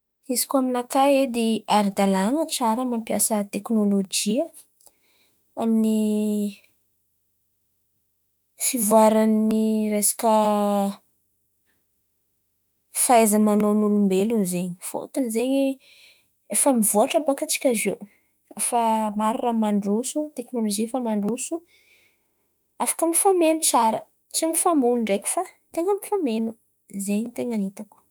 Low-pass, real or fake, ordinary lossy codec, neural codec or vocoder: none; fake; none; autoencoder, 48 kHz, 32 numbers a frame, DAC-VAE, trained on Japanese speech